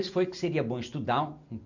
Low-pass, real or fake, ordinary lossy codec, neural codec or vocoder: 7.2 kHz; real; none; none